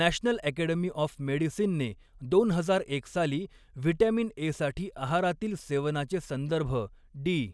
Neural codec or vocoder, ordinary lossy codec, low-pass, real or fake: none; none; none; real